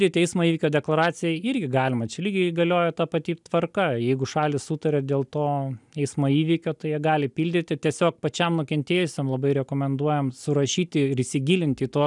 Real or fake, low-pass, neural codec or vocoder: real; 10.8 kHz; none